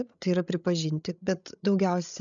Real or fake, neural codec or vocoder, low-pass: fake; codec, 16 kHz, 16 kbps, FreqCodec, larger model; 7.2 kHz